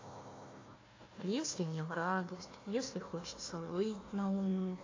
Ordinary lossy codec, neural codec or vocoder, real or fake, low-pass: AAC, 32 kbps; codec, 16 kHz, 1 kbps, FunCodec, trained on Chinese and English, 50 frames a second; fake; 7.2 kHz